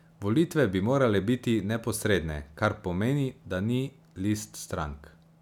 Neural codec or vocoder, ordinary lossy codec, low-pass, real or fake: none; none; 19.8 kHz; real